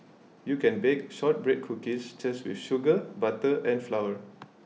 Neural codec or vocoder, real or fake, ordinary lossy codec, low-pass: none; real; none; none